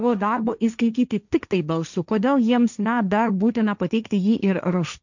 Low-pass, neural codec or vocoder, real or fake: 7.2 kHz; codec, 16 kHz, 1.1 kbps, Voila-Tokenizer; fake